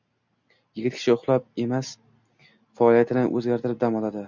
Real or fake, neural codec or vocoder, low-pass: real; none; 7.2 kHz